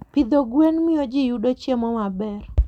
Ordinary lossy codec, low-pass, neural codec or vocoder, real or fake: none; 19.8 kHz; none; real